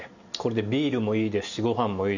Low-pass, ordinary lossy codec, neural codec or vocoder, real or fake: 7.2 kHz; none; none; real